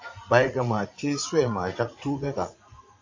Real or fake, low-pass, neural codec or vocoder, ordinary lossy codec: fake; 7.2 kHz; vocoder, 44.1 kHz, 80 mel bands, Vocos; AAC, 48 kbps